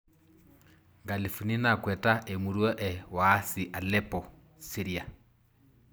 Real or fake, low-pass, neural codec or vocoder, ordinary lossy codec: real; none; none; none